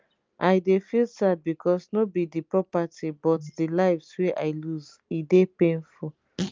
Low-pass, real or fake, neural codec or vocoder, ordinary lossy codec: 7.2 kHz; real; none; Opus, 24 kbps